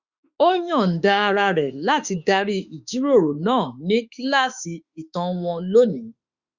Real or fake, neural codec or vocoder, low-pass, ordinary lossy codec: fake; autoencoder, 48 kHz, 32 numbers a frame, DAC-VAE, trained on Japanese speech; 7.2 kHz; Opus, 64 kbps